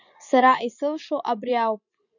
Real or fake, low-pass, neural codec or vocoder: fake; 7.2 kHz; vocoder, 24 kHz, 100 mel bands, Vocos